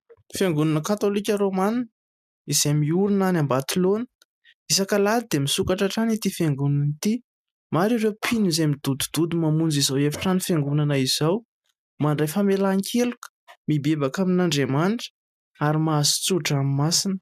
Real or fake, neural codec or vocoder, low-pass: real; none; 14.4 kHz